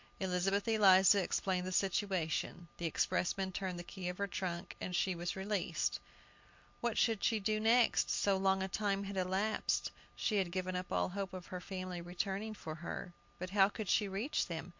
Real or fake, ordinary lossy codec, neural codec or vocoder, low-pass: real; MP3, 48 kbps; none; 7.2 kHz